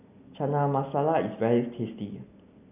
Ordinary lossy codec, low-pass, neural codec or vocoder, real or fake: none; 3.6 kHz; none; real